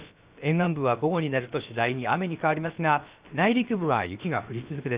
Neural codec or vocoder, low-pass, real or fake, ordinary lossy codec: codec, 16 kHz, about 1 kbps, DyCAST, with the encoder's durations; 3.6 kHz; fake; Opus, 64 kbps